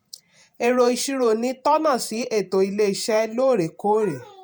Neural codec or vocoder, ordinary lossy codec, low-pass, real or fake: vocoder, 48 kHz, 128 mel bands, Vocos; none; none; fake